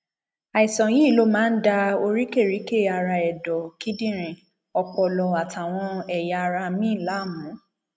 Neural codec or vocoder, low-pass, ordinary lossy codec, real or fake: none; none; none; real